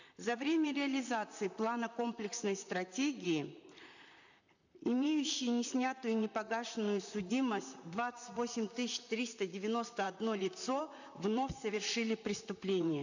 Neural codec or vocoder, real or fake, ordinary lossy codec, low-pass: vocoder, 44.1 kHz, 128 mel bands, Pupu-Vocoder; fake; none; 7.2 kHz